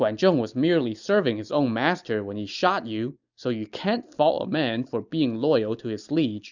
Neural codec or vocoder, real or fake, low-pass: none; real; 7.2 kHz